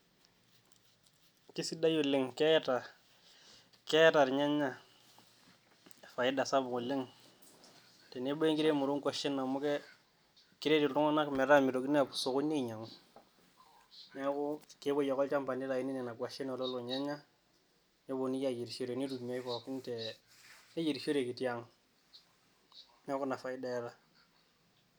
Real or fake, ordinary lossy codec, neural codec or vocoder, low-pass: real; none; none; none